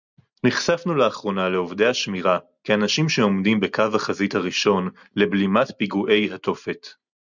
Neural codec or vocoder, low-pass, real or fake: none; 7.2 kHz; real